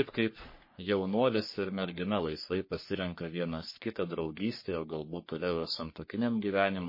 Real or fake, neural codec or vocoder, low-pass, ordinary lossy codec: fake; codec, 44.1 kHz, 3.4 kbps, Pupu-Codec; 5.4 kHz; MP3, 24 kbps